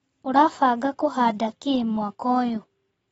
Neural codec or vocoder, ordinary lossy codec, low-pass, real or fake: none; AAC, 24 kbps; 19.8 kHz; real